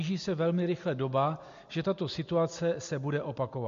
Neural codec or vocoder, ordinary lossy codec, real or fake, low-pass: none; MP3, 48 kbps; real; 7.2 kHz